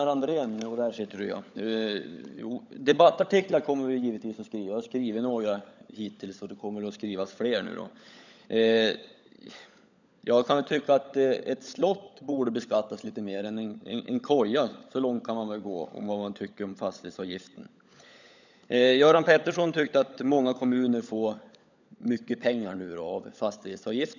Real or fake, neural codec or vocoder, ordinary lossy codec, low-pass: fake; codec, 16 kHz, 16 kbps, FunCodec, trained on LibriTTS, 50 frames a second; none; 7.2 kHz